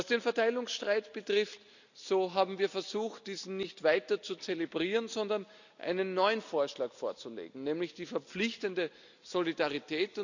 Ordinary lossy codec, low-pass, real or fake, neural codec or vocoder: none; 7.2 kHz; real; none